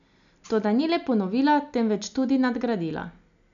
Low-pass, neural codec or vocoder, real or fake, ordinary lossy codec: 7.2 kHz; none; real; none